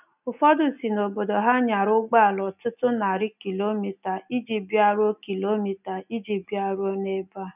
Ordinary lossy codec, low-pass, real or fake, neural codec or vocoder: none; 3.6 kHz; real; none